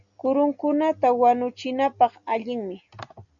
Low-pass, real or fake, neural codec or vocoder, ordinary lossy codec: 7.2 kHz; real; none; MP3, 96 kbps